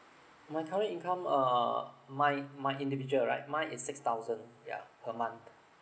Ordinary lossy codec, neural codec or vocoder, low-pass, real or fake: none; none; none; real